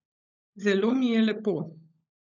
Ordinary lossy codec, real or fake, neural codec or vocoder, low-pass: none; fake; codec, 16 kHz, 16 kbps, FunCodec, trained on LibriTTS, 50 frames a second; 7.2 kHz